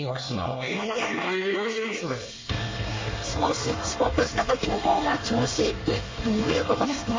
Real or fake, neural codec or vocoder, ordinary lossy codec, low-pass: fake; codec, 24 kHz, 1 kbps, SNAC; MP3, 32 kbps; 7.2 kHz